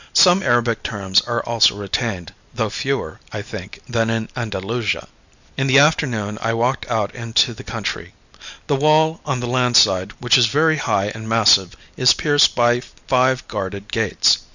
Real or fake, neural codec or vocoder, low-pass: real; none; 7.2 kHz